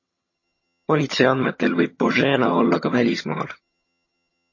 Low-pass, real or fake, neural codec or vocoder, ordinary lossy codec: 7.2 kHz; fake; vocoder, 22.05 kHz, 80 mel bands, HiFi-GAN; MP3, 32 kbps